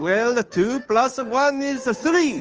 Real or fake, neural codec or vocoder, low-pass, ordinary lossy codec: fake; codec, 16 kHz, 2 kbps, FunCodec, trained on Chinese and English, 25 frames a second; 7.2 kHz; Opus, 24 kbps